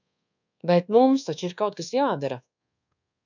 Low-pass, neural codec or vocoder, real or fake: 7.2 kHz; codec, 24 kHz, 1.2 kbps, DualCodec; fake